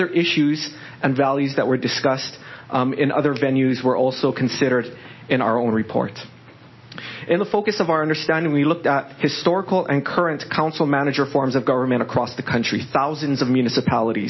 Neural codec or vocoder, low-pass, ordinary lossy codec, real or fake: none; 7.2 kHz; MP3, 24 kbps; real